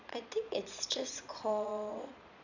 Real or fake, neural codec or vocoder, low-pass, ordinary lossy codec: fake; vocoder, 22.05 kHz, 80 mel bands, Vocos; 7.2 kHz; none